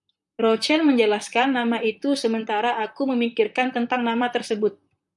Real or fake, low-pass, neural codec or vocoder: fake; 10.8 kHz; vocoder, 44.1 kHz, 128 mel bands, Pupu-Vocoder